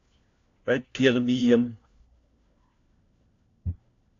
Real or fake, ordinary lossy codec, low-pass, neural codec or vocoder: fake; AAC, 48 kbps; 7.2 kHz; codec, 16 kHz, 1 kbps, FunCodec, trained on LibriTTS, 50 frames a second